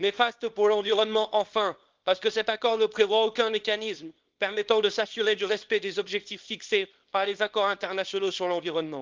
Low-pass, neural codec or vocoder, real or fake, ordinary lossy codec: 7.2 kHz; codec, 24 kHz, 0.9 kbps, WavTokenizer, small release; fake; Opus, 32 kbps